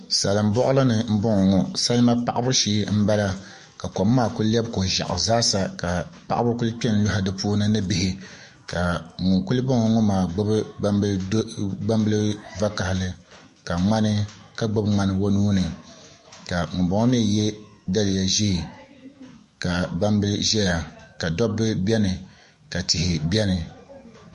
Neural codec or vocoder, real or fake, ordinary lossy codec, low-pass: autoencoder, 48 kHz, 128 numbers a frame, DAC-VAE, trained on Japanese speech; fake; MP3, 48 kbps; 14.4 kHz